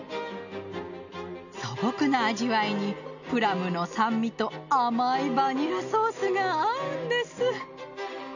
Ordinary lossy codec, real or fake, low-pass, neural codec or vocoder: none; real; 7.2 kHz; none